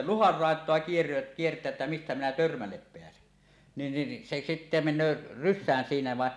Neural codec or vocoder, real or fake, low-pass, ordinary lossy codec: none; real; none; none